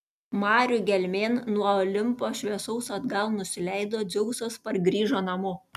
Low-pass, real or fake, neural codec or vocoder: 14.4 kHz; real; none